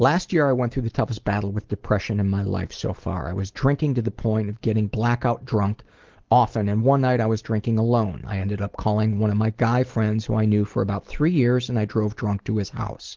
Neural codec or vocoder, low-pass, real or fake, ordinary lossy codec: none; 7.2 kHz; real; Opus, 16 kbps